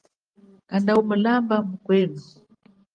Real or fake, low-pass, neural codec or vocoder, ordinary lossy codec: fake; 9.9 kHz; vocoder, 44.1 kHz, 128 mel bands every 512 samples, BigVGAN v2; Opus, 24 kbps